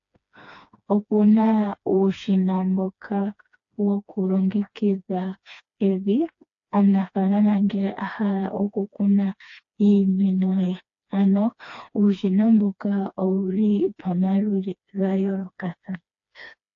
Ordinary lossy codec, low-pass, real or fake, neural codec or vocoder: AAC, 48 kbps; 7.2 kHz; fake; codec, 16 kHz, 2 kbps, FreqCodec, smaller model